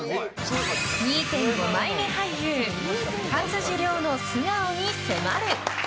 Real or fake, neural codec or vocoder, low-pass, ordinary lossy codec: real; none; none; none